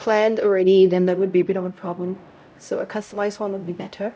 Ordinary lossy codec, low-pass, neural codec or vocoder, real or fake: none; none; codec, 16 kHz, 0.5 kbps, X-Codec, HuBERT features, trained on LibriSpeech; fake